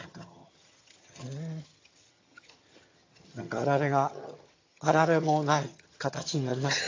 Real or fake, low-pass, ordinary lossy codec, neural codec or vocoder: fake; 7.2 kHz; AAC, 32 kbps; vocoder, 22.05 kHz, 80 mel bands, HiFi-GAN